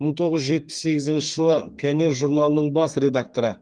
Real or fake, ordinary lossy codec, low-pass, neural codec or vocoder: fake; Opus, 32 kbps; 9.9 kHz; codec, 32 kHz, 1.9 kbps, SNAC